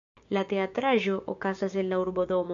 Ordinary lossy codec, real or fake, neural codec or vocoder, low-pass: AAC, 64 kbps; fake; codec, 16 kHz, 6 kbps, DAC; 7.2 kHz